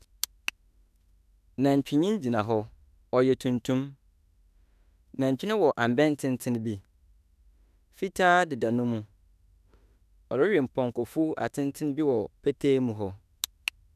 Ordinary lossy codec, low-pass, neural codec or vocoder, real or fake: none; 14.4 kHz; autoencoder, 48 kHz, 32 numbers a frame, DAC-VAE, trained on Japanese speech; fake